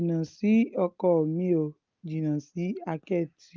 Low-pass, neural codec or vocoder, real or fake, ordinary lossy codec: 7.2 kHz; none; real; Opus, 32 kbps